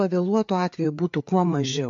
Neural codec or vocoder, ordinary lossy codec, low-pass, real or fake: codec, 16 kHz, 4 kbps, FreqCodec, larger model; MP3, 48 kbps; 7.2 kHz; fake